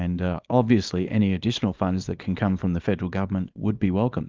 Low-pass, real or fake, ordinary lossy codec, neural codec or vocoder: 7.2 kHz; fake; Opus, 32 kbps; codec, 24 kHz, 0.9 kbps, WavTokenizer, small release